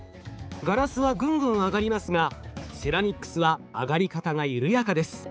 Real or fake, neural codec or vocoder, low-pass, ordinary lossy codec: fake; codec, 16 kHz, 4 kbps, X-Codec, HuBERT features, trained on balanced general audio; none; none